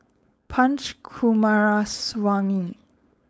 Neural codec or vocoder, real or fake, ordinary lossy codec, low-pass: codec, 16 kHz, 4.8 kbps, FACodec; fake; none; none